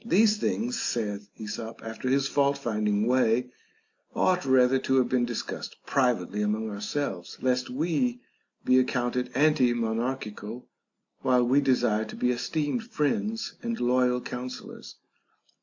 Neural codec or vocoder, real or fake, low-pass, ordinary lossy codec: none; real; 7.2 kHz; AAC, 48 kbps